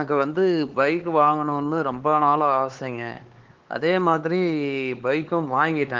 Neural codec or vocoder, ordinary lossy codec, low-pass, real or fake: codec, 16 kHz, 4 kbps, X-Codec, HuBERT features, trained on LibriSpeech; Opus, 16 kbps; 7.2 kHz; fake